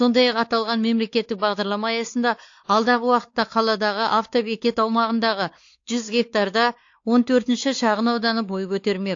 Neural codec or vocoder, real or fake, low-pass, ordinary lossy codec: codec, 16 kHz, 4 kbps, X-Codec, WavLM features, trained on Multilingual LibriSpeech; fake; 7.2 kHz; AAC, 48 kbps